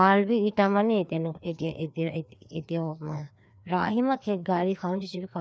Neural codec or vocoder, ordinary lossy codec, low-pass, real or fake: codec, 16 kHz, 2 kbps, FreqCodec, larger model; none; none; fake